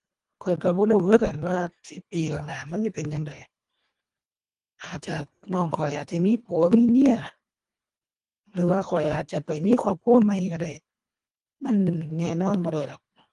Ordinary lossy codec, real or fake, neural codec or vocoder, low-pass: Opus, 32 kbps; fake; codec, 24 kHz, 1.5 kbps, HILCodec; 10.8 kHz